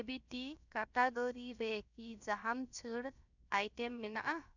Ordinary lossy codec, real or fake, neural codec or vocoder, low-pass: none; fake; codec, 16 kHz, 0.7 kbps, FocalCodec; 7.2 kHz